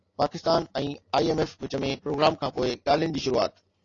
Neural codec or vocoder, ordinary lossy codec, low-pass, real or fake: none; AAC, 32 kbps; 7.2 kHz; real